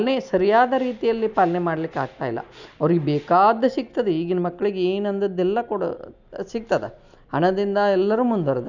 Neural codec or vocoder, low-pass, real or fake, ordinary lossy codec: none; 7.2 kHz; real; none